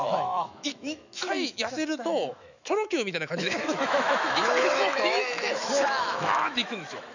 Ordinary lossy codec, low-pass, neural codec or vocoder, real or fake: none; 7.2 kHz; autoencoder, 48 kHz, 128 numbers a frame, DAC-VAE, trained on Japanese speech; fake